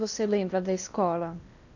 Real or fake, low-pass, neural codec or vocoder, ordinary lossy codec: fake; 7.2 kHz; codec, 16 kHz in and 24 kHz out, 0.8 kbps, FocalCodec, streaming, 65536 codes; none